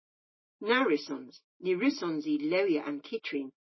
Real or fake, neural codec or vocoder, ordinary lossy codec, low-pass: real; none; MP3, 24 kbps; 7.2 kHz